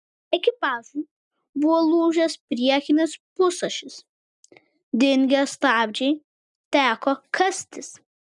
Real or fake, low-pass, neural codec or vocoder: real; 10.8 kHz; none